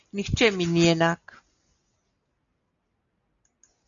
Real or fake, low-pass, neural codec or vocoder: real; 7.2 kHz; none